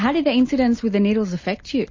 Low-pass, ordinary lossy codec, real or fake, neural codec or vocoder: 7.2 kHz; MP3, 32 kbps; real; none